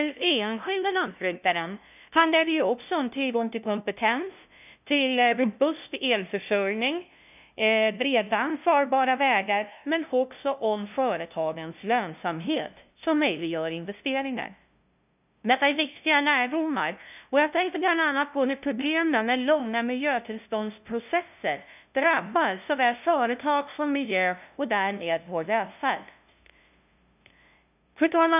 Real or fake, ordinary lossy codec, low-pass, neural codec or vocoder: fake; none; 3.6 kHz; codec, 16 kHz, 0.5 kbps, FunCodec, trained on LibriTTS, 25 frames a second